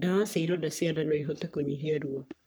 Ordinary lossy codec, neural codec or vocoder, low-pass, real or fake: none; codec, 44.1 kHz, 3.4 kbps, Pupu-Codec; none; fake